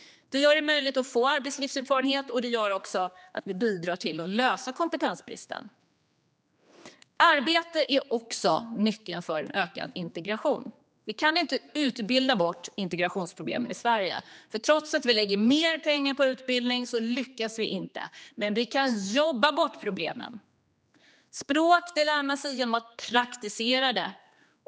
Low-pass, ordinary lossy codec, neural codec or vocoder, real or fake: none; none; codec, 16 kHz, 2 kbps, X-Codec, HuBERT features, trained on general audio; fake